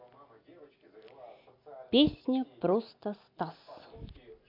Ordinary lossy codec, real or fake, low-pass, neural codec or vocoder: MP3, 48 kbps; real; 5.4 kHz; none